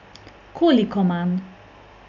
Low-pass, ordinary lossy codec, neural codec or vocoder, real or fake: 7.2 kHz; none; none; real